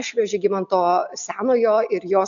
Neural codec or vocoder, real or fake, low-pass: none; real; 7.2 kHz